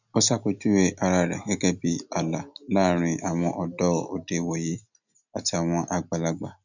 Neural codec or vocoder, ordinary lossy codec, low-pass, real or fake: none; none; 7.2 kHz; real